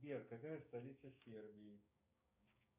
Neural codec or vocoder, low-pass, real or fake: codec, 16 kHz, 6 kbps, DAC; 3.6 kHz; fake